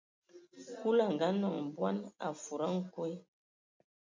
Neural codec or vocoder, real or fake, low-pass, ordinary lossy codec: none; real; 7.2 kHz; MP3, 64 kbps